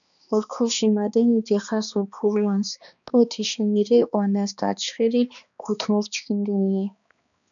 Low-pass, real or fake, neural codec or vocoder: 7.2 kHz; fake; codec, 16 kHz, 2 kbps, X-Codec, HuBERT features, trained on balanced general audio